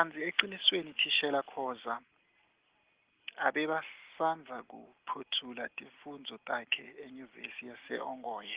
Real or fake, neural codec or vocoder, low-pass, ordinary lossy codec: real; none; 3.6 kHz; Opus, 16 kbps